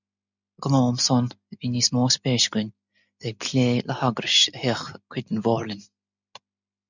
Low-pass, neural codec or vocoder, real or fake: 7.2 kHz; none; real